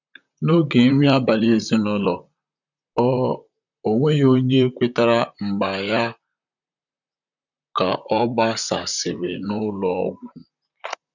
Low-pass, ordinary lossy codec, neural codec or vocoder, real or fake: 7.2 kHz; none; vocoder, 44.1 kHz, 128 mel bands, Pupu-Vocoder; fake